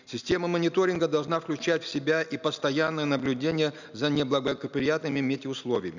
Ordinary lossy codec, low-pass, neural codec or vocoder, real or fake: none; 7.2 kHz; none; real